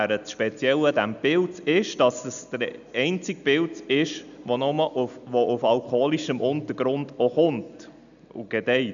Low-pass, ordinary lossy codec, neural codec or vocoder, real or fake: 7.2 kHz; none; none; real